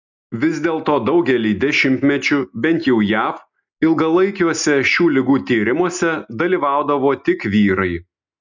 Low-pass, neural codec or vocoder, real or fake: 7.2 kHz; none; real